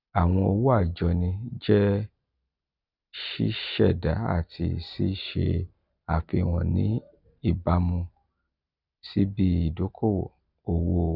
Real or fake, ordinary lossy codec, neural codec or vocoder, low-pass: real; none; none; 5.4 kHz